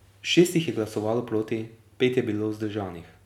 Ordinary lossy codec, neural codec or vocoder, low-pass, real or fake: none; none; 19.8 kHz; real